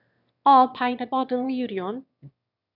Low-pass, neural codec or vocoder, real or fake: 5.4 kHz; autoencoder, 22.05 kHz, a latent of 192 numbers a frame, VITS, trained on one speaker; fake